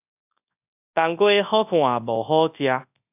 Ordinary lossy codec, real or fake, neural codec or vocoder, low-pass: AAC, 32 kbps; fake; codec, 24 kHz, 0.9 kbps, WavTokenizer, large speech release; 3.6 kHz